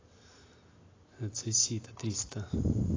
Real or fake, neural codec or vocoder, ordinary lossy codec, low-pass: real; none; AAC, 32 kbps; 7.2 kHz